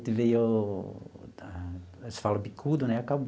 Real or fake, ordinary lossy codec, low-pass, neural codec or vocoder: real; none; none; none